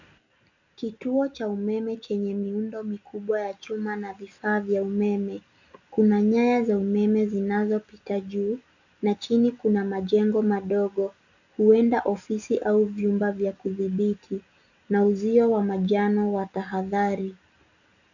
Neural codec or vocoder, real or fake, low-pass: none; real; 7.2 kHz